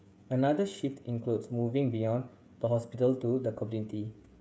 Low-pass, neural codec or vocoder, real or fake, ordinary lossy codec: none; codec, 16 kHz, 16 kbps, FreqCodec, smaller model; fake; none